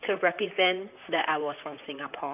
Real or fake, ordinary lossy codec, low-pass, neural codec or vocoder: fake; none; 3.6 kHz; vocoder, 44.1 kHz, 128 mel bands, Pupu-Vocoder